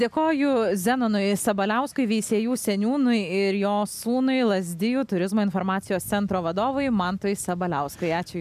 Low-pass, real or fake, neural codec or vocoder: 14.4 kHz; real; none